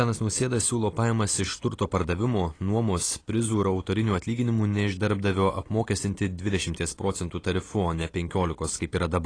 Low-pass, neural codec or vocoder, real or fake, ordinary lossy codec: 9.9 kHz; none; real; AAC, 32 kbps